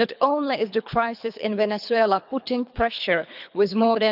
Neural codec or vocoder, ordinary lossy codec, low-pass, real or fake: codec, 24 kHz, 3 kbps, HILCodec; none; 5.4 kHz; fake